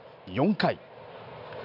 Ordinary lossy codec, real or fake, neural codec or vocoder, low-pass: none; real; none; 5.4 kHz